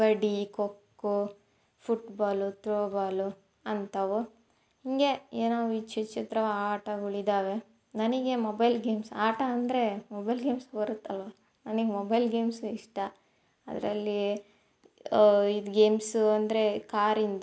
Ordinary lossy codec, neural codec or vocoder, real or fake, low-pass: none; none; real; none